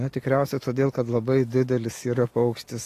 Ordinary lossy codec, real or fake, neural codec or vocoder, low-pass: AAC, 48 kbps; real; none; 14.4 kHz